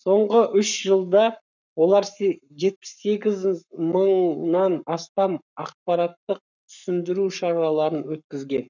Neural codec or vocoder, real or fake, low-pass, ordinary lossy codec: codec, 44.1 kHz, 7.8 kbps, Pupu-Codec; fake; 7.2 kHz; none